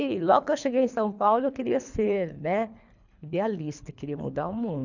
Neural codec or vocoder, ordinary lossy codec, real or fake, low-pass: codec, 24 kHz, 3 kbps, HILCodec; none; fake; 7.2 kHz